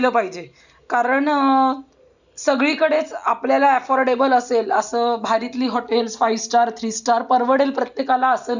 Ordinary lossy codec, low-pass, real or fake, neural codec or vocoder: none; 7.2 kHz; real; none